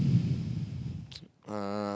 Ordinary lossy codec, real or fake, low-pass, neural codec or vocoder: none; real; none; none